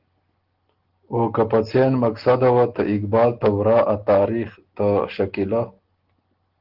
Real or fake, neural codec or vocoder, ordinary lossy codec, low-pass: real; none; Opus, 16 kbps; 5.4 kHz